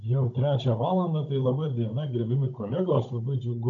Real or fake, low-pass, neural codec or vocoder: fake; 7.2 kHz; codec, 16 kHz, 16 kbps, FunCodec, trained on Chinese and English, 50 frames a second